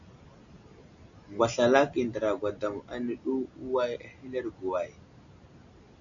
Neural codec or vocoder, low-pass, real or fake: none; 7.2 kHz; real